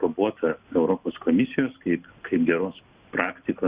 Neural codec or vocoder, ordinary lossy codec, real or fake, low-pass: none; Opus, 64 kbps; real; 3.6 kHz